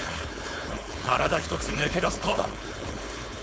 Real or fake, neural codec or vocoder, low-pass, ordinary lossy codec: fake; codec, 16 kHz, 4.8 kbps, FACodec; none; none